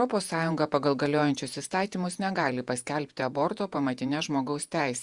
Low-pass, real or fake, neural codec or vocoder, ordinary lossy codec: 10.8 kHz; fake; vocoder, 48 kHz, 128 mel bands, Vocos; Opus, 64 kbps